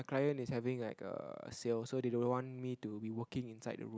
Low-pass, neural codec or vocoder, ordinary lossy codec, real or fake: none; none; none; real